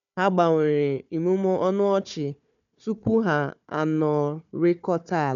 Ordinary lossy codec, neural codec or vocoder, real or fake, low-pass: none; codec, 16 kHz, 4 kbps, FunCodec, trained on Chinese and English, 50 frames a second; fake; 7.2 kHz